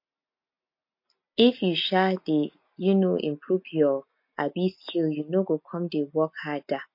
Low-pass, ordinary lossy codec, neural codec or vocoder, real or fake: 5.4 kHz; MP3, 32 kbps; none; real